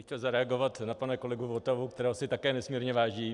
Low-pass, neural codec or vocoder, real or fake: 10.8 kHz; none; real